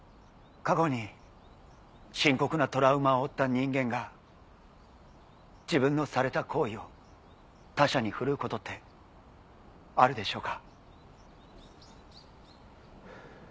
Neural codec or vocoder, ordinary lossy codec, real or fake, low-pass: none; none; real; none